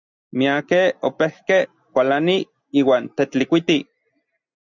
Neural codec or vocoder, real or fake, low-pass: none; real; 7.2 kHz